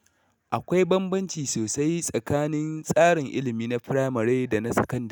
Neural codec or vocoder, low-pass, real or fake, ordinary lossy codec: none; none; real; none